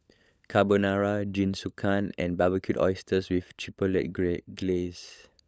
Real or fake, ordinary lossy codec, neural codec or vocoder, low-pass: fake; none; codec, 16 kHz, 16 kbps, FunCodec, trained on LibriTTS, 50 frames a second; none